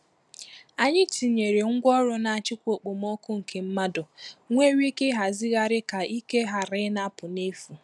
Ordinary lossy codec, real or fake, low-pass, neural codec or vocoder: none; real; none; none